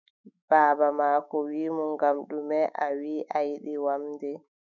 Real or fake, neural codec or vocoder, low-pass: fake; autoencoder, 48 kHz, 128 numbers a frame, DAC-VAE, trained on Japanese speech; 7.2 kHz